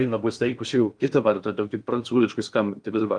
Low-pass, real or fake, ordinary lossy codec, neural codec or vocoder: 9.9 kHz; fake; Opus, 32 kbps; codec, 16 kHz in and 24 kHz out, 0.6 kbps, FocalCodec, streaming, 2048 codes